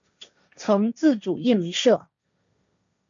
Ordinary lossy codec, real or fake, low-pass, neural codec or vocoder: MP3, 64 kbps; fake; 7.2 kHz; codec, 16 kHz, 1.1 kbps, Voila-Tokenizer